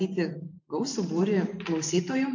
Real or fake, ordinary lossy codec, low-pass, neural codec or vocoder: fake; MP3, 48 kbps; 7.2 kHz; vocoder, 44.1 kHz, 128 mel bands every 512 samples, BigVGAN v2